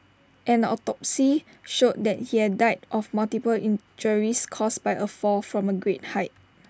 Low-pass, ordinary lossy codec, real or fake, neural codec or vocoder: none; none; real; none